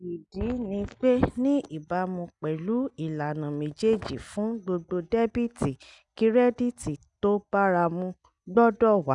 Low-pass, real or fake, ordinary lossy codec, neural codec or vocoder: 10.8 kHz; real; none; none